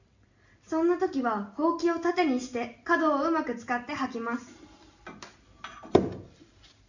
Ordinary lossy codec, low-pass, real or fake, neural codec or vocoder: none; 7.2 kHz; real; none